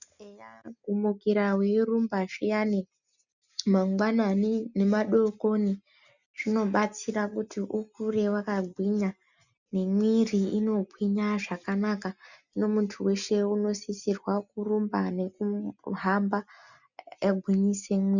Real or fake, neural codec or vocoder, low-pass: real; none; 7.2 kHz